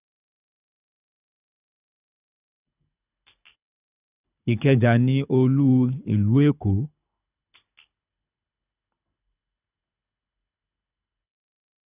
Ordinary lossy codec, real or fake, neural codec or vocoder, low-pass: none; fake; codec, 24 kHz, 6 kbps, HILCodec; 3.6 kHz